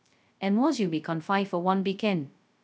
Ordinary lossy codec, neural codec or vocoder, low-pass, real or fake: none; codec, 16 kHz, 0.2 kbps, FocalCodec; none; fake